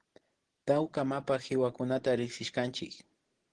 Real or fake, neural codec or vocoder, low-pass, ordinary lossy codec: real; none; 9.9 kHz; Opus, 16 kbps